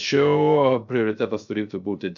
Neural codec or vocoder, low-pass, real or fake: codec, 16 kHz, 0.3 kbps, FocalCodec; 7.2 kHz; fake